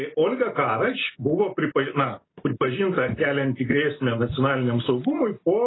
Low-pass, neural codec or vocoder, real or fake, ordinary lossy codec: 7.2 kHz; none; real; AAC, 16 kbps